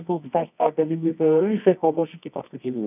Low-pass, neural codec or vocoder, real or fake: 3.6 kHz; codec, 24 kHz, 0.9 kbps, WavTokenizer, medium music audio release; fake